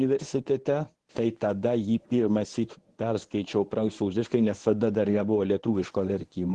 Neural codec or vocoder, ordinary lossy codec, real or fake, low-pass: codec, 24 kHz, 0.9 kbps, WavTokenizer, medium speech release version 1; Opus, 16 kbps; fake; 10.8 kHz